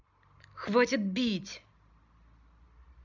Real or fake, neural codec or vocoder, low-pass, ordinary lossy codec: real; none; 7.2 kHz; none